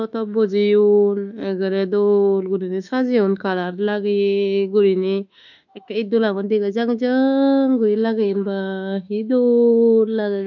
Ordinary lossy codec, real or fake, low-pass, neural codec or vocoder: none; fake; 7.2 kHz; autoencoder, 48 kHz, 32 numbers a frame, DAC-VAE, trained on Japanese speech